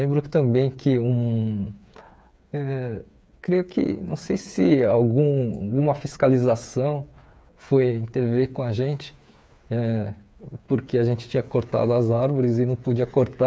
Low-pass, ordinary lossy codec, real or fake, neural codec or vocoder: none; none; fake; codec, 16 kHz, 8 kbps, FreqCodec, smaller model